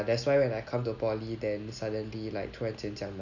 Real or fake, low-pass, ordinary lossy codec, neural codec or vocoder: real; 7.2 kHz; none; none